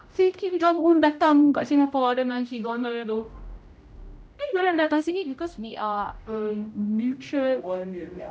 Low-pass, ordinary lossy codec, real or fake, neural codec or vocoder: none; none; fake; codec, 16 kHz, 0.5 kbps, X-Codec, HuBERT features, trained on general audio